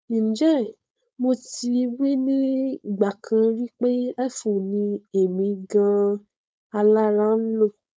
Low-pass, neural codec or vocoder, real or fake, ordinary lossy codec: none; codec, 16 kHz, 4.8 kbps, FACodec; fake; none